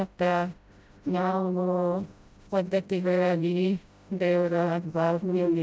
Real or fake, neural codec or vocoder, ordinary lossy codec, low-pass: fake; codec, 16 kHz, 0.5 kbps, FreqCodec, smaller model; none; none